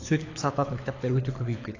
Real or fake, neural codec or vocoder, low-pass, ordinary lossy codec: fake; codec, 24 kHz, 6 kbps, HILCodec; 7.2 kHz; AAC, 32 kbps